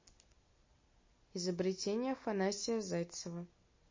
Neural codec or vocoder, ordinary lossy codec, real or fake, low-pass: none; MP3, 32 kbps; real; 7.2 kHz